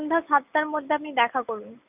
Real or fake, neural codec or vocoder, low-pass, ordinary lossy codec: real; none; 3.6 kHz; none